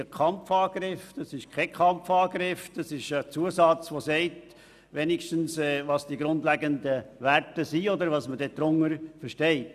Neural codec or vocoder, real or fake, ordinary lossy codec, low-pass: none; real; none; 14.4 kHz